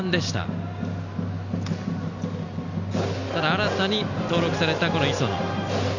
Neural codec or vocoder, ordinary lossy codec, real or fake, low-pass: none; none; real; 7.2 kHz